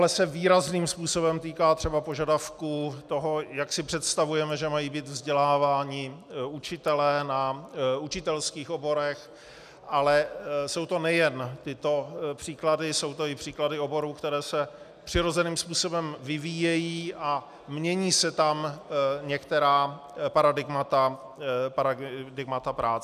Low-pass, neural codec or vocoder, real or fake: 14.4 kHz; none; real